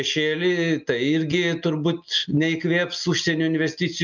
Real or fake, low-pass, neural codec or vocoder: real; 7.2 kHz; none